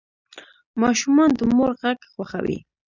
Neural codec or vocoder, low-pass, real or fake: none; 7.2 kHz; real